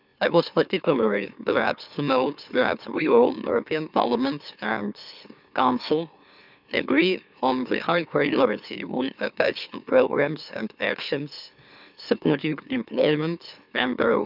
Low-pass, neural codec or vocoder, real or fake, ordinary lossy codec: 5.4 kHz; autoencoder, 44.1 kHz, a latent of 192 numbers a frame, MeloTTS; fake; none